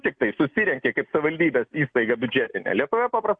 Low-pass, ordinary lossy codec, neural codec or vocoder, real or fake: 9.9 kHz; MP3, 64 kbps; none; real